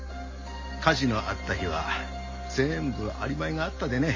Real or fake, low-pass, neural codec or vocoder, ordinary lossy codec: real; 7.2 kHz; none; MP3, 32 kbps